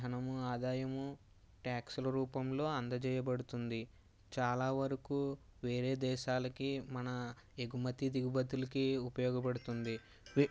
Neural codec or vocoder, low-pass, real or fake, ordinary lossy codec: none; none; real; none